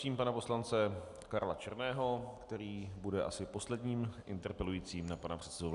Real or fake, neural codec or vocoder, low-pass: real; none; 10.8 kHz